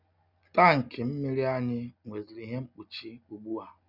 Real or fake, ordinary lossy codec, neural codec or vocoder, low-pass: real; none; none; 5.4 kHz